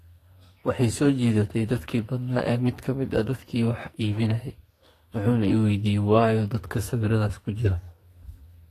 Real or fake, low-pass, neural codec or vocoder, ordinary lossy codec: fake; 14.4 kHz; codec, 44.1 kHz, 2.6 kbps, SNAC; AAC, 48 kbps